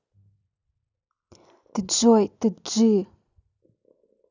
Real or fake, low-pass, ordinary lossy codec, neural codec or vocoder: real; 7.2 kHz; none; none